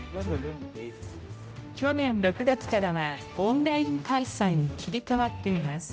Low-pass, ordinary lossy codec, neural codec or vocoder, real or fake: none; none; codec, 16 kHz, 0.5 kbps, X-Codec, HuBERT features, trained on general audio; fake